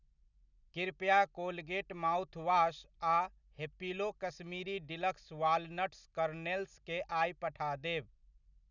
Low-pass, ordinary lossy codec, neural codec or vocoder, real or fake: 7.2 kHz; none; none; real